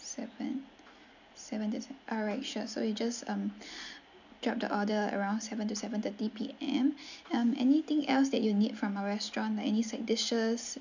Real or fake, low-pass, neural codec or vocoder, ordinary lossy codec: real; 7.2 kHz; none; none